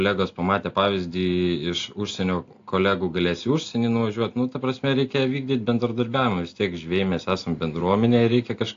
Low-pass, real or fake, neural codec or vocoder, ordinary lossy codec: 7.2 kHz; real; none; AAC, 48 kbps